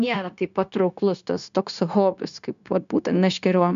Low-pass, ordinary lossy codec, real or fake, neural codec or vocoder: 7.2 kHz; MP3, 64 kbps; fake; codec, 16 kHz, 0.9 kbps, LongCat-Audio-Codec